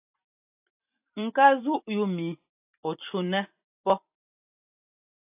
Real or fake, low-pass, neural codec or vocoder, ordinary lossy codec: fake; 3.6 kHz; vocoder, 44.1 kHz, 128 mel bands every 256 samples, BigVGAN v2; AAC, 32 kbps